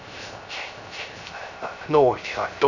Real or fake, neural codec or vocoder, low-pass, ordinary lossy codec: fake; codec, 16 kHz, 0.3 kbps, FocalCodec; 7.2 kHz; none